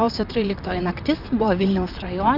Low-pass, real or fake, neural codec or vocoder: 5.4 kHz; fake; vocoder, 44.1 kHz, 128 mel bands, Pupu-Vocoder